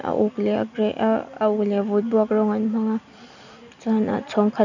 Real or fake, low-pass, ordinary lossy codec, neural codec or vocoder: real; 7.2 kHz; none; none